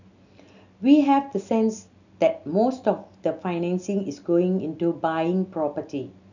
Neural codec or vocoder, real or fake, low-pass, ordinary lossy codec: none; real; 7.2 kHz; none